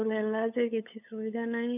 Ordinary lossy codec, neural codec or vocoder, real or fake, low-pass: none; codec, 16 kHz, 8 kbps, FunCodec, trained on LibriTTS, 25 frames a second; fake; 3.6 kHz